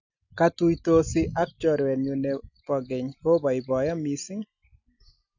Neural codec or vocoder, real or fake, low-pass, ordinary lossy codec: none; real; 7.2 kHz; none